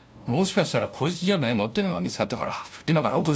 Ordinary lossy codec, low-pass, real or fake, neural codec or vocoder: none; none; fake; codec, 16 kHz, 0.5 kbps, FunCodec, trained on LibriTTS, 25 frames a second